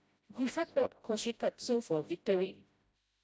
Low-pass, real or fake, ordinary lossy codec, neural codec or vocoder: none; fake; none; codec, 16 kHz, 0.5 kbps, FreqCodec, smaller model